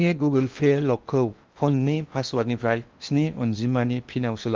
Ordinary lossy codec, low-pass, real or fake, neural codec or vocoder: Opus, 32 kbps; 7.2 kHz; fake; codec, 16 kHz in and 24 kHz out, 0.8 kbps, FocalCodec, streaming, 65536 codes